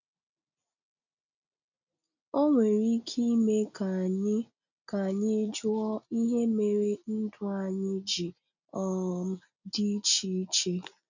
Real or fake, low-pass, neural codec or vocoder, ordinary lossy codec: real; 7.2 kHz; none; none